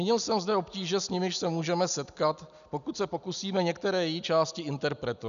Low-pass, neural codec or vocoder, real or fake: 7.2 kHz; none; real